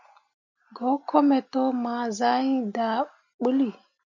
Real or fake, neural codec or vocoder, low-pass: real; none; 7.2 kHz